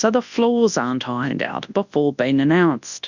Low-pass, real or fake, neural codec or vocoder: 7.2 kHz; fake; codec, 24 kHz, 0.9 kbps, WavTokenizer, large speech release